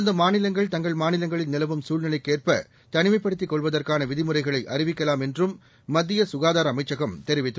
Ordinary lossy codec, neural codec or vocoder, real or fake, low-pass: none; none; real; none